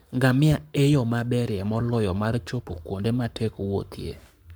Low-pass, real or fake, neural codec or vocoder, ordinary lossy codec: none; fake; codec, 44.1 kHz, 7.8 kbps, Pupu-Codec; none